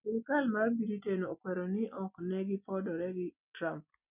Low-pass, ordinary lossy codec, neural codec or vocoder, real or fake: 3.6 kHz; none; none; real